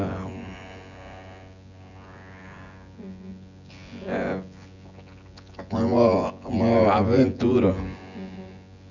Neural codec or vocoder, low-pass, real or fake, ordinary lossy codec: vocoder, 24 kHz, 100 mel bands, Vocos; 7.2 kHz; fake; none